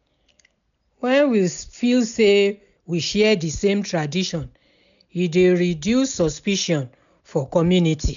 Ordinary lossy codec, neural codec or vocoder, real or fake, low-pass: MP3, 96 kbps; none; real; 7.2 kHz